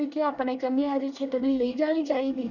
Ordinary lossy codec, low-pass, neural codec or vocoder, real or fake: none; 7.2 kHz; codec, 24 kHz, 1 kbps, SNAC; fake